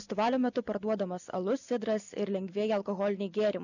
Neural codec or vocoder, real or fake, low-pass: none; real; 7.2 kHz